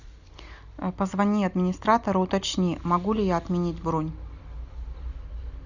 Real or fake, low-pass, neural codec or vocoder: real; 7.2 kHz; none